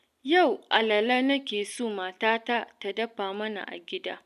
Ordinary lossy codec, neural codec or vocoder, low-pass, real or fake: none; none; 14.4 kHz; real